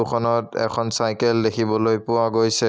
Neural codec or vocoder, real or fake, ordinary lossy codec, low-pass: none; real; none; none